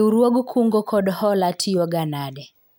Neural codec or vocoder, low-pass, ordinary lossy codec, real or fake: none; none; none; real